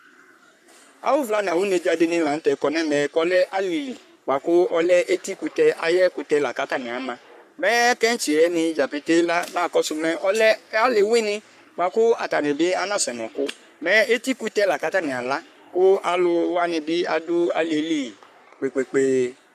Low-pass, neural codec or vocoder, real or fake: 14.4 kHz; codec, 44.1 kHz, 3.4 kbps, Pupu-Codec; fake